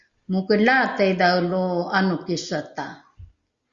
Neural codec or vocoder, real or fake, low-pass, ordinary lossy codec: none; real; 7.2 kHz; Opus, 64 kbps